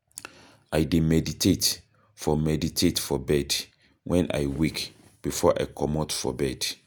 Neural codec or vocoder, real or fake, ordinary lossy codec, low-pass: none; real; none; none